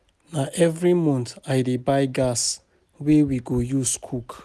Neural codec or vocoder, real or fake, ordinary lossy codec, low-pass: none; real; none; none